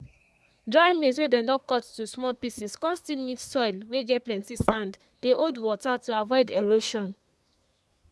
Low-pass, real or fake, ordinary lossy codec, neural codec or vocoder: none; fake; none; codec, 24 kHz, 1 kbps, SNAC